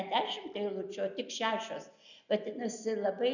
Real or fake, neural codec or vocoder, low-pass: real; none; 7.2 kHz